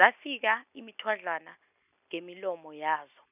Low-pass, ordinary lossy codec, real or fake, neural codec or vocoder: 3.6 kHz; none; real; none